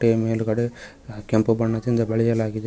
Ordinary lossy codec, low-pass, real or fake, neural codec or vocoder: none; none; real; none